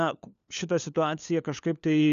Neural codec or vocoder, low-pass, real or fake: codec, 16 kHz, 4 kbps, FunCodec, trained on LibriTTS, 50 frames a second; 7.2 kHz; fake